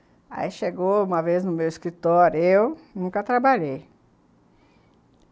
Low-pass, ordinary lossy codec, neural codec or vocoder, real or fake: none; none; none; real